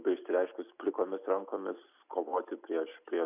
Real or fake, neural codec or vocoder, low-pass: real; none; 3.6 kHz